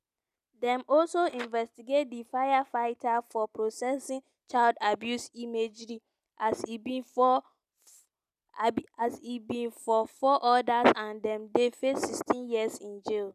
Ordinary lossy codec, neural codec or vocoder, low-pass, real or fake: none; none; 14.4 kHz; real